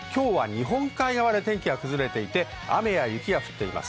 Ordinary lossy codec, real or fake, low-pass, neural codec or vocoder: none; real; none; none